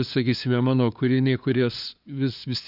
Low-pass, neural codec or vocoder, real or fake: 5.4 kHz; codec, 16 kHz, 2 kbps, FunCodec, trained on LibriTTS, 25 frames a second; fake